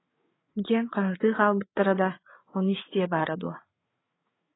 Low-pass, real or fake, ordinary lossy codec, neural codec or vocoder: 7.2 kHz; fake; AAC, 16 kbps; codec, 16 kHz, 8 kbps, FreqCodec, larger model